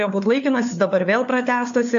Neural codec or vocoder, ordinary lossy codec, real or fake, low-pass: codec, 16 kHz, 4 kbps, FunCodec, trained on LibriTTS, 50 frames a second; AAC, 64 kbps; fake; 7.2 kHz